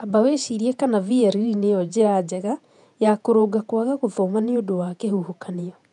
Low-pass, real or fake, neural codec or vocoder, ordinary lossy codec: 10.8 kHz; fake; vocoder, 48 kHz, 128 mel bands, Vocos; none